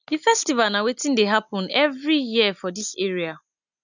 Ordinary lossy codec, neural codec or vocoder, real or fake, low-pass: none; none; real; 7.2 kHz